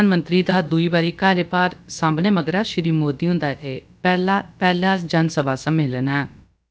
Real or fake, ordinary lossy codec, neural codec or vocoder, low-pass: fake; none; codec, 16 kHz, about 1 kbps, DyCAST, with the encoder's durations; none